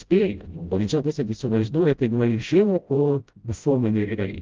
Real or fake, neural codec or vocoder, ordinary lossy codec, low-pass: fake; codec, 16 kHz, 0.5 kbps, FreqCodec, smaller model; Opus, 24 kbps; 7.2 kHz